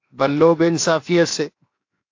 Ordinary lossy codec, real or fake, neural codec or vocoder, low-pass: AAC, 48 kbps; fake; codec, 16 kHz, 0.7 kbps, FocalCodec; 7.2 kHz